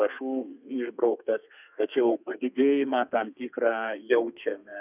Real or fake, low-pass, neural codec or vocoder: fake; 3.6 kHz; codec, 32 kHz, 1.9 kbps, SNAC